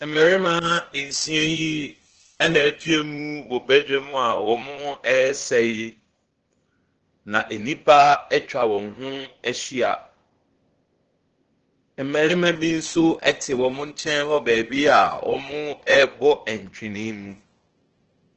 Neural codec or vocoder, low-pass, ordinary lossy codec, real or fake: codec, 16 kHz, 0.8 kbps, ZipCodec; 7.2 kHz; Opus, 16 kbps; fake